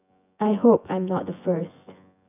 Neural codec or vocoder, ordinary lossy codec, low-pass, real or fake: vocoder, 24 kHz, 100 mel bands, Vocos; none; 3.6 kHz; fake